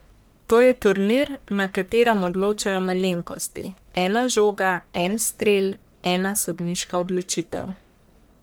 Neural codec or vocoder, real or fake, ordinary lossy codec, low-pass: codec, 44.1 kHz, 1.7 kbps, Pupu-Codec; fake; none; none